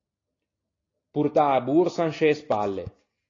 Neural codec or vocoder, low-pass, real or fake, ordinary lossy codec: none; 7.2 kHz; real; AAC, 32 kbps